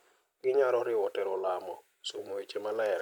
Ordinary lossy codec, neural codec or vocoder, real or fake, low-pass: none; none; real; none